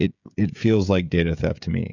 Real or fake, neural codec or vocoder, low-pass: fake; codec, 16 kHz, 16 kbps, FreqCodec, smaller model; 7.2 kHz